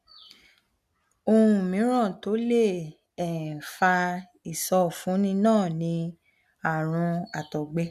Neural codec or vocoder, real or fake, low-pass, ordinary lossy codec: none; real; 14.4 kHz; none